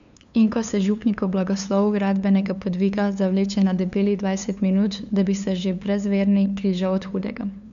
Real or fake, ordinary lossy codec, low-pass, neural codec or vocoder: fake; AAC, 96 kbps; 7.2 kHz; codec, 16 kHz, 2 kbps, FunCodec, trained on LibriTTS, 25 frames a second